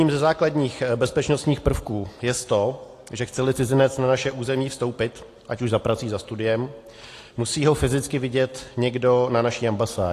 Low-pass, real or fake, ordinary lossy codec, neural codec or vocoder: 14.4 kHz; real; AAC, 48 kbps; none